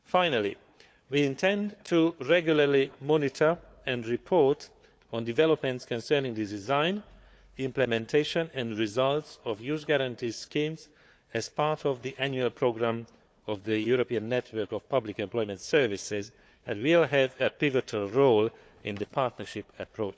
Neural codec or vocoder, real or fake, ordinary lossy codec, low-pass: codec, 16 kHz, 4 kbps, FunCodec, trained on Chinese and English, 50 frames a second; fake; none; none